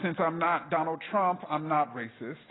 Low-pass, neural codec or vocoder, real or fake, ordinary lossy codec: 7.2 kHz; none; real; AAC, 16 kbps